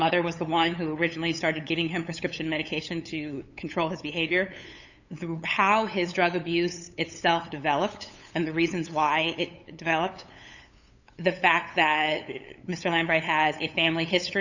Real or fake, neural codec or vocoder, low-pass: fake; codec, 16 kHz, 8 kbps, FunCodec, trained on LibriTTS, 25 frames a second; 7.2 kHz